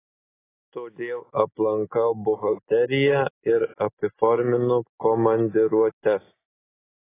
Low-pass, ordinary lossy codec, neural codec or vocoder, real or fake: 3.6 kHz; AAC, 16 kbps; none; real